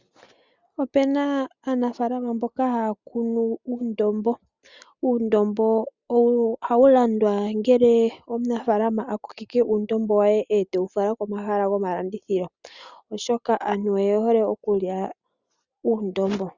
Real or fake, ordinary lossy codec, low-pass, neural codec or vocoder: real; Opus, 64 kbps; 7.2 kHz; none